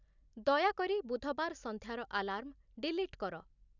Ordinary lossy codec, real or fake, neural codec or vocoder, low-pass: none; real; none; 7.2 kHz